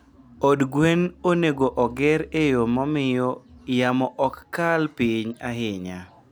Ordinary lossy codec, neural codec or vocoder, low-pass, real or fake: none; none; none; real